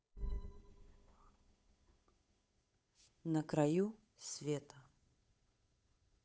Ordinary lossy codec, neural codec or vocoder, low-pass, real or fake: none; codec, 16 kHz, 8 kbps, FunCodec, trained on Chinese and English, 25 frames a second; none; fake